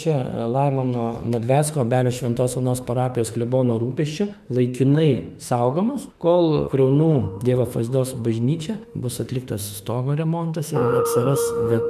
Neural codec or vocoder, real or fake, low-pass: autoencoder, 48 kHz, 32 numbers a frame, DAC-VAE, trained on Japanese speech; fake; 14.4 kHz